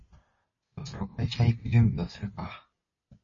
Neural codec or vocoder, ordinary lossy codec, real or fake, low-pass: none; MP3, 32 kbps; real; 7.2 kHz